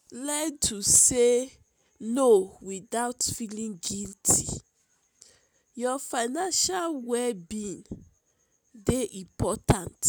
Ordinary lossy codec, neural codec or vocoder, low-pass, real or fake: none; none; none; real